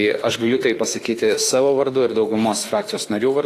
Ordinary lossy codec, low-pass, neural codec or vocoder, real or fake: AAC, 48 kbps; 14.4 kHz; autoencoder, 48 kHz, 32 numbers a frame, DAC-VAE, trained on Japanese speech; fake